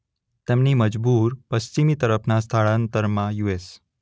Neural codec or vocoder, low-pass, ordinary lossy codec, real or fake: none; none; none; real